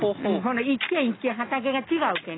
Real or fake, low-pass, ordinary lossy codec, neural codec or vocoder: real; 7.2 kHz; AAC, 16 kbps; none